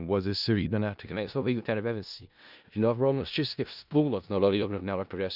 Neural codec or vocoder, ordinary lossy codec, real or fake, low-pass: codec, 16 kHz in and 24 kHz out, 0.4 kbps, LongCat-Audio-Codec, four codebook decoder; none; fake; 5.4 kHz